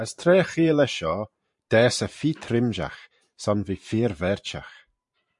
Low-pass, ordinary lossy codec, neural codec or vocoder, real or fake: 10.8 kHz; MP3, 64 kbps; vocoder, 44.1 kHz, 128 mel bands every 512 samples, BigVGAN v2; fake